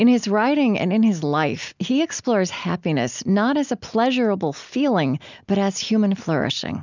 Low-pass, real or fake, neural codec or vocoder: 7.2 kHz; real; none